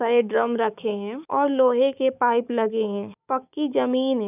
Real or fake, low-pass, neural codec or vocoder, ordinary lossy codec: fake; 3.6 kHz; codec, 16 kHz, 6 kbps, DAC; none